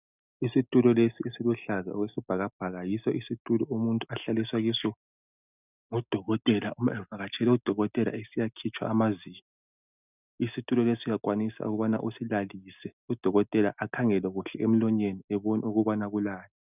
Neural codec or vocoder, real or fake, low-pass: none; real; 3.6 kHz